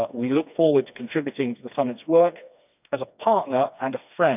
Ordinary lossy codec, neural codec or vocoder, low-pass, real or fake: none; codec, 16 kHz, 2 kbps, FreqCodec, smaller model; 3.6 kHz; fake